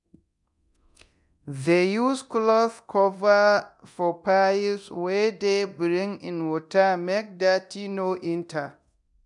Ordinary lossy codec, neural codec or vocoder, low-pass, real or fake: none; codec, 24 kHz, 0.9 kbps, DualCodec; 10.8 kHz; fake